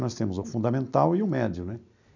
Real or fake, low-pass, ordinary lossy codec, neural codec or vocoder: real; 7.2 kHz; none; none